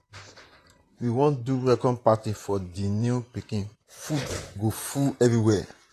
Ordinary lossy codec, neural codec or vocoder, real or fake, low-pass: AAC, 48 kbps; vocoder, 44.1 kHz, 128 mel bands, Pupu-Vocoder; fake; 14.4 kHz